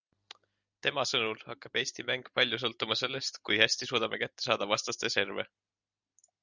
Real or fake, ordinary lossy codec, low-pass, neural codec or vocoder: real; Opus, 64 kbps; 7.2 kHz; none